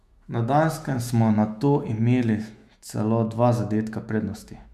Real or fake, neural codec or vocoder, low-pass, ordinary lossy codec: fake; autoencoder, 48 kHz, 128 numbers a frame, DAC-VAE, trained on Japanese speech; 14.4 kHz; MP3, 96 kbps